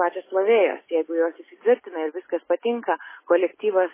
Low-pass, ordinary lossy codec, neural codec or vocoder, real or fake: 3.6 kHz; MP3, 16 kbps; none; real